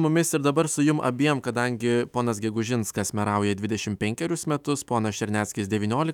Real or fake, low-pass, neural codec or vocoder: fake; 19.8 kHz; autoencoder, 48 kHz, 128 numbers a frame, DAC-VAE, trained on Japanese speech